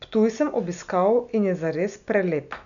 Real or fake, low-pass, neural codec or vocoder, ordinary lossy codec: real; 7.2 kHz; none; none